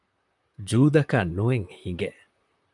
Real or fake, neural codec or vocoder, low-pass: fake; vocoder, 44.1 kHz, 128 mel bands, Pupu-Vocoder; 10.8 kHz